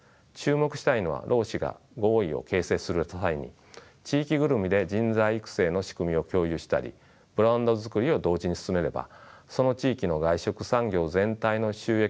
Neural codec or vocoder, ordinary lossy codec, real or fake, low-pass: none; none; real; none